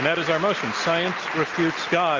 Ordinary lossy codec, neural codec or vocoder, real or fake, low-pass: Opus, 32 kbps; none; real; 7.2 kHz